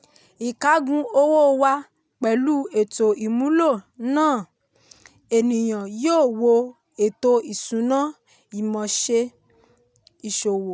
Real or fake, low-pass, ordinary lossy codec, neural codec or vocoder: real; none; none; none